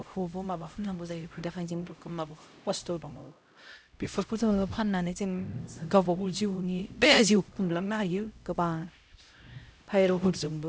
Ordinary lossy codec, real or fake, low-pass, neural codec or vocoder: none; fake; none; codec, 16 kHz, 0.5 kbps, X-Codec, HuBERT features, trained on LibriSpeech